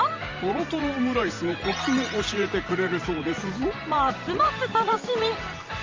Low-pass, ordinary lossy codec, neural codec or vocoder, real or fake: 7.2 kHz; Opus, 32 kbps; vocoder, 44.1 kHz, 128 mel bands every 512 samples, BigVGAN v2; fake